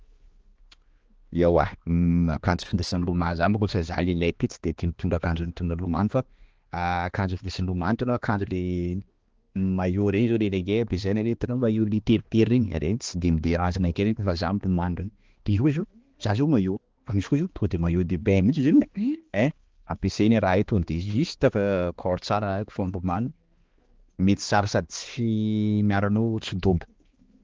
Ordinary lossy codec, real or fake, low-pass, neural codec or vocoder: Opus, 16 kbps; fake; 7.2 kHz; codec, 16 kHz, 2 kbps, X-Codec, HuBERT features, trained on balanced general audio